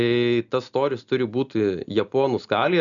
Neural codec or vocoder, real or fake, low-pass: none; real; 7.2 kHz